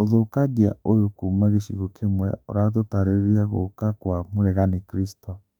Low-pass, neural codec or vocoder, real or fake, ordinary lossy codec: 19.8 kHz; autoencoder, 48 kHz, 32 numbers a frame, DAC-VAE, trained on Japanese speech; fake; none